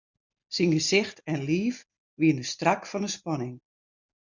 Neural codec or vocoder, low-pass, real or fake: none; 7.2 kHz; real